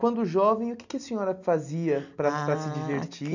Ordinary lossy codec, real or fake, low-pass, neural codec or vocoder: none; real; 7.2 kHz; none